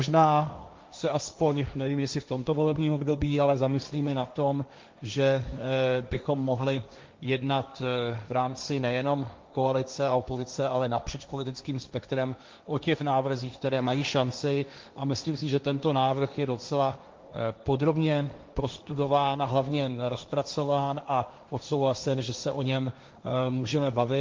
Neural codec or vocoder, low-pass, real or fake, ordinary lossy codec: codec, 16 kHz, 1.1 kbps, Voila-Tokenizer; 7.2 kHz; fake; Opus, 24 kbps